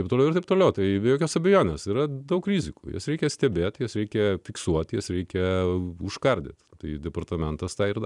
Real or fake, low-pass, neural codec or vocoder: fake; 10.8 kHz; vocoder, 44.1 kHz, 128 mel bands every 256 samples, BigVGAN v2